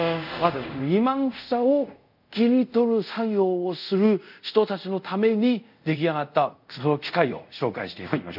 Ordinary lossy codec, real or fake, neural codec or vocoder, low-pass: none; fake; codec, 24 kHz, 0.5 kbps, DualCodec; 5.4 kHz